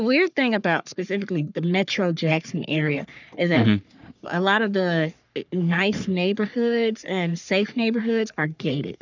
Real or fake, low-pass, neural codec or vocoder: fake; 7.2 kHz; codec, 44.1 kHz, 3.4 kbps, Pupu-Codec